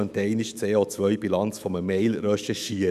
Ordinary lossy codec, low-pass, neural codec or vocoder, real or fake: none; 14.4 kHz; autoencoder, 48 kHz, 128 numbers a frame, DAC-VAE, trained on Japanese speech; fake